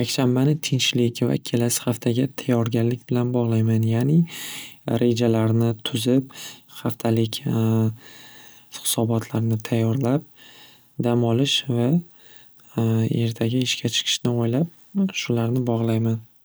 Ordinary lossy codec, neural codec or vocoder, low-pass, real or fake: none; none; none; real